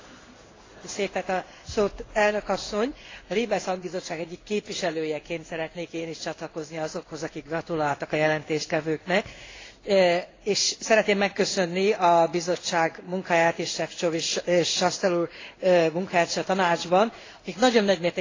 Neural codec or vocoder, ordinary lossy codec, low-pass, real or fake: codec, 16 kHz in and 24 kHz out, 1 kbps, XY-Tokenizer; AAC, 32 kbps; 7.2 kHz; fake